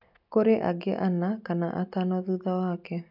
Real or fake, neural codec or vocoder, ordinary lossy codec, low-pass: real; none; none; 5.4 kHz